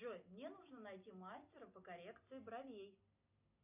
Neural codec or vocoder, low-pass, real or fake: none; 3.6 kHz; real